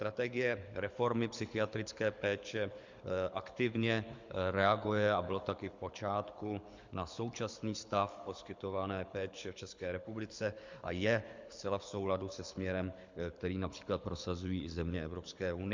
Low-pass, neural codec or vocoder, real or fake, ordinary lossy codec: 7.2 kHz; codec, 24 kHz, 6 kbps, HILCodec; fake; MP3, 64 kbps